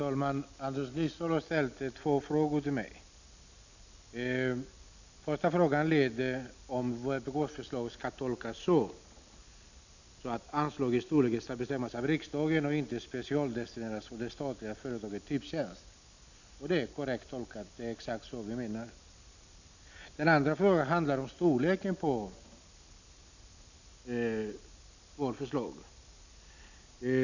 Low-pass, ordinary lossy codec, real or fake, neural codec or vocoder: 7.2 kHz; none; real; none